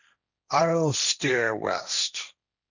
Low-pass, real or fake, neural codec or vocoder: 7.2 kHz; fake; codec, 16 kHz, 1.1 kbps, Voila-Tokenizer